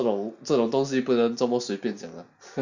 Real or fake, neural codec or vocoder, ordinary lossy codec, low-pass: real; none; none; 7.2 kHz